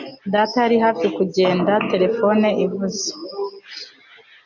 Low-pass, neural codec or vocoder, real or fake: 7.2 kHz; none; real